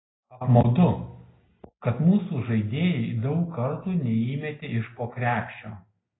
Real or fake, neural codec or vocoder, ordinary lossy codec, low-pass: real; none; AAC, 16 kbps; 7.2 kHz